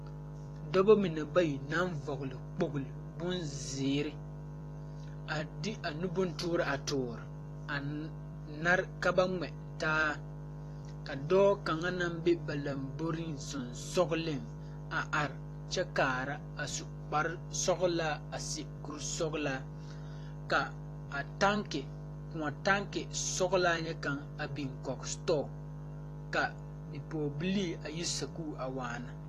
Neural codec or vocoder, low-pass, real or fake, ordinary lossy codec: vocoder, 44.1 kHz, 128 mel bands every 256 samples, BigVGAN v2; 14.4 kHz; fake; AAC, 48 kbps